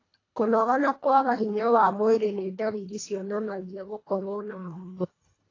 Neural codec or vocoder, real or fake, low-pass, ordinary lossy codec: codec, 24 kHz, 1.5 kbps, HILCodec; fake; 7.2 kHz; AAC, 32 kbps